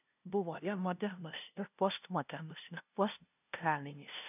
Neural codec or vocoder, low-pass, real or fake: codec, 16 kHz, 0.5 kbps, FunCodec, trained on LibriTTS, 25 frames a second; 3.6 kHz; fake